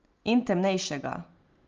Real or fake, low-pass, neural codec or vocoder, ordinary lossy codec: real; 7.2 kHz; none; Opus, 24 kbps